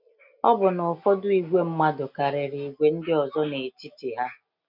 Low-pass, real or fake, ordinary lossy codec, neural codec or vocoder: 5.4 kHz; real; none; none